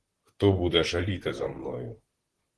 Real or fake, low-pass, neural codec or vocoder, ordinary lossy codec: fake; 10.8 kHz; vocoder, 44.1 kHz, 128 mel bands, Pupu-Vocoder; Opus, 16 kbps